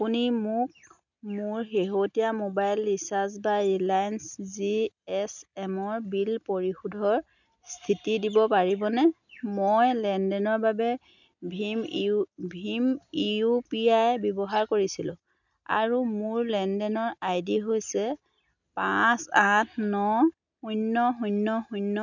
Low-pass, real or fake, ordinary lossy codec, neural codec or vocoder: 7.2 kHz; real; none; none